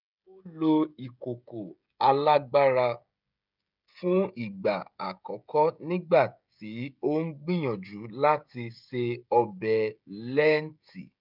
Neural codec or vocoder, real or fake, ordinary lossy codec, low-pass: codec, 16 kHz, 16 kbps, FreqCodec, smaller model; fake; none; 5.4 kHz